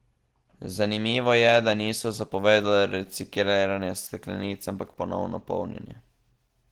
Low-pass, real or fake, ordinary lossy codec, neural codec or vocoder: 19.8 kHz; real; Opus, 16 kbps; none